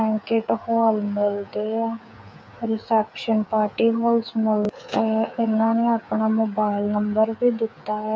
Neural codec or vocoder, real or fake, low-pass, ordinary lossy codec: codec, 16 kHz, 8 kbps, FreqCodec, smaller model; fake; none; none